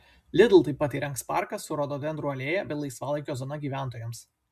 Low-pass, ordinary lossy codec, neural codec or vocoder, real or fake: 14.4 kHz; MP3, 96 kbps; none; real